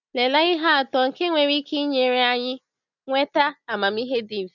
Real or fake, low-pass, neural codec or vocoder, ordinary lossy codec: real; 7.2 kHz; none; none